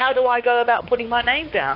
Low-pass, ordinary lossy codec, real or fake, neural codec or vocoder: 5.4 kHz; AAC, 32 kbps; fake; codec, 16 kHz, 4 kbps, X-Codec, WavLM features, trained on Multilingual LibriSpeech